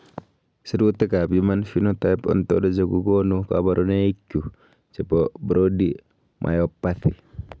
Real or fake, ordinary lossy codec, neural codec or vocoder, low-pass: real; none; none; none